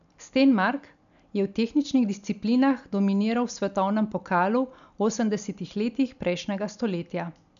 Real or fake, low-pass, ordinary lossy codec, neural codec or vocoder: real; 7.2 kHz; none; none